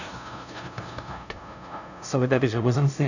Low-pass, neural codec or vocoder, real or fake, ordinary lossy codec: 7.2 kHz; codec, 16 kHz, 0.5 kbps, FunCodec, trained on LibriTTS, 25 frames a second; fake; none